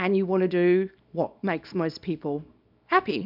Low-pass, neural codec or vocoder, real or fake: 5.4 kHz; codec, 24 kHz, 0.9 kbps, WavTokenizer, small release; fake